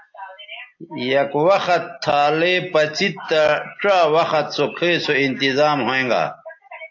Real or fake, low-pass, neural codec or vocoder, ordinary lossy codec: real; 7.2 kHz; none; AAC, 48 kbps